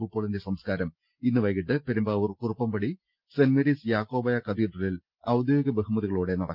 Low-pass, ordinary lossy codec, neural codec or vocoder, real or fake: 5.4 kHz; Opus, 24 kbps; none; real